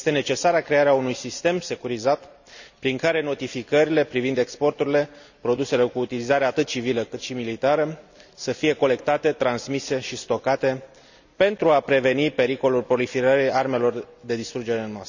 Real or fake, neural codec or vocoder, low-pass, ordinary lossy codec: real; none; 7.2 kHz; none